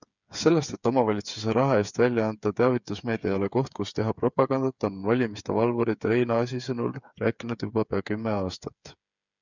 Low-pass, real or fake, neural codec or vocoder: 7.2 kHz; fake; codec, 16 kHz, 8 kbps, FreqCodec, smaller model